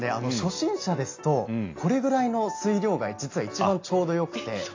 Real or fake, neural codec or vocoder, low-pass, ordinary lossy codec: real; none; 7.2 kHz; AAC, 32 kbps